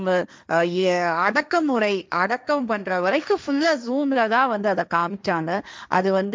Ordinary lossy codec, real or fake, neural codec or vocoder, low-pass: none; fake; codec, 16 kHz, 1.1 kbps, Voila-Tokenizer; none